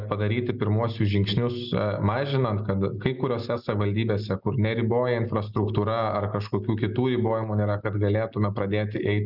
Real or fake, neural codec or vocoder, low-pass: real; none; 5.4 kHz